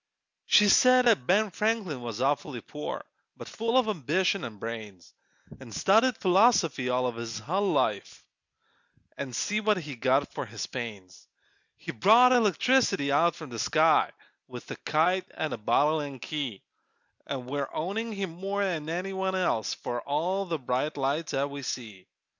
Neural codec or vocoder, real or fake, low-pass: none; real; 7.2 kHz